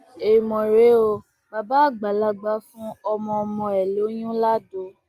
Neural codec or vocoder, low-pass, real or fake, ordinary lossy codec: none; 14.4 kHz; real; Opus, 32 kbps